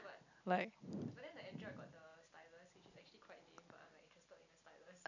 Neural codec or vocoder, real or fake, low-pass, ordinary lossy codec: none; real; 7.2 kHz; none